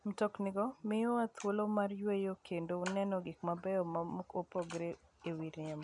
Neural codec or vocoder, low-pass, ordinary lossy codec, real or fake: none; 10.8 kHz; none; real